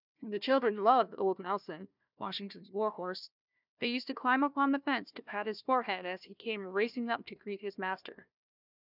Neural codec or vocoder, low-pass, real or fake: codec, 16 kHz, 1 kbps, FunCodec, trained on Chinese and English, 50 frames a second; 5.4 kHz; fake